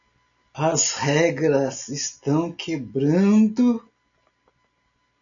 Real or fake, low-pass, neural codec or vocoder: real; 7.2 kHz; none